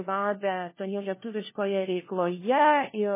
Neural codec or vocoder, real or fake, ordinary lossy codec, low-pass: codec, 16 kHz, 1 kbps, FunCodec, trained on LibriTTS, 50 frames a second; fake; MP3, 16 kbps; 3.6 kHz